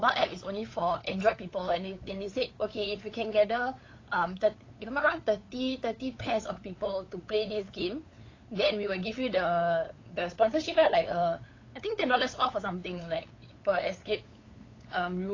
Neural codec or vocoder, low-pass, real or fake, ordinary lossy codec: codec, 16 kHz, 8 kbps, FunCodec, trained on LibriTTS, 25 frames a second; 7.2 kHz; fake; AAC, 32 kbps